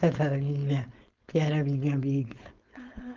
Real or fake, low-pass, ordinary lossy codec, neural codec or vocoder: fake; 7.2 kHz; Opus, 24 kbps; codec, 16 kHz, 4.8 kbps, FACodec